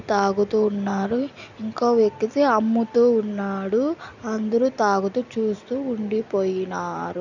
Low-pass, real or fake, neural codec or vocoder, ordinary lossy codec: 7.2 kHz; real; none; none